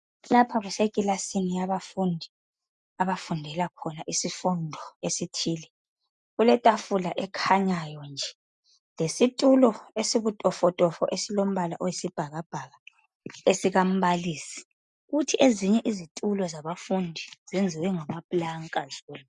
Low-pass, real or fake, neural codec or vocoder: 10.8 kHz; real; none